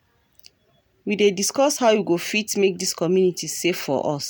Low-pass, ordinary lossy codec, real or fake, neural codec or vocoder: none; none; real; none